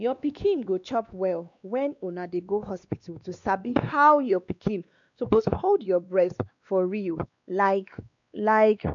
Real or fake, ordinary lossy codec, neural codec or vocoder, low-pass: fake; none; codec, 16 kHz, 2 kbps, X-Codec, WavLM features, trained on Multilingual LibriSpeech; 7.2 kHz